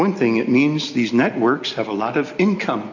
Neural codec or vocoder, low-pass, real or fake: none; 7.2 kHz; real